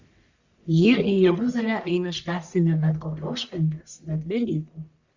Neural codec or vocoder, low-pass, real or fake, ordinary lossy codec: codec, 44.1 kHz, 1.7 kbps, Pupu-Codec; 7.2 kHz; fake; Opus, 64 kbps